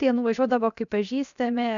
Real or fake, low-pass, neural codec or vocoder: fake; 7.2 kHz; codec, 16 kHz, 0.7 kbps, FocalCodec